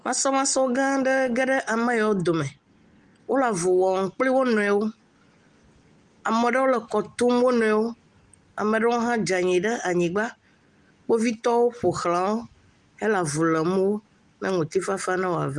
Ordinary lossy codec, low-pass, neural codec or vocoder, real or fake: Opus, 24 kbps; 10.8 kHz; none; real